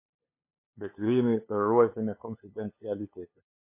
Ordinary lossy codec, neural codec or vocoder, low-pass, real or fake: MP3, 24 kbps; codec, 16 kHz, 2 kbps, FunCodec, trained on LibriTTS, 25 frames a second; 3.6 kHz; fake